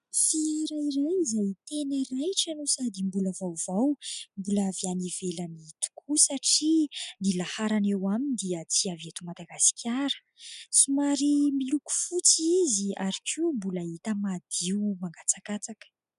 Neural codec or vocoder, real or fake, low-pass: none; real; 10.8 kHz